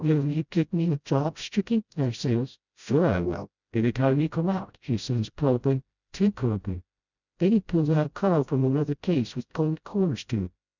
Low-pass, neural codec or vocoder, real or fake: 7.2 kHz; codec, 16 kHz, 0.5 kbps, FreqCodec, smaller model; fake